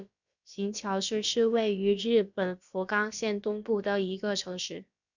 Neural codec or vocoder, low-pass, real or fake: codec, 16 kHz, about 1 kbps, DyCAST, with the encoder's durations; 7.2 kHz; fake